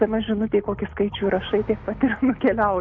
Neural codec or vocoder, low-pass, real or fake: none; 7.2 kHz; real